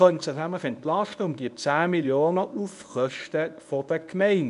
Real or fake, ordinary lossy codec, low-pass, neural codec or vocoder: fake; none; 10.8 kHz; codec, 24 kHz, 0.9 kbps, WavTokenizer, medium speech release version 1